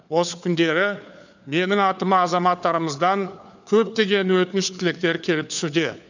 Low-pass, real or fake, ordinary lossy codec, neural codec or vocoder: 7.2 kHz; fake; none; codec, 16 kHz, 4 kbps, FunCodec, trained on LibriTTS, 50 frames a second